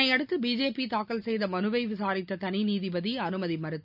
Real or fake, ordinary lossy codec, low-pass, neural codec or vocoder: real; none; 5.4 kHz; none